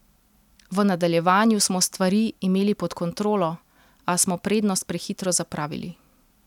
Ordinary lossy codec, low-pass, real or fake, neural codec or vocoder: none; 19.8 kHz; real; none